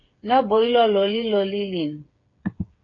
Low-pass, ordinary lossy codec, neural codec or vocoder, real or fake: 7.2 kHz; AAC, 32 kbps; codec, 16 kHz, 8 kbps, FreqCodec, smaller model; fake